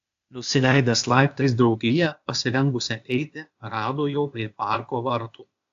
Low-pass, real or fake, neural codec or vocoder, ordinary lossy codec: 7.2 kHz; fake; codec, 16 kHz, 0.8 kbps, ZipCodec; AAC, 64 kbps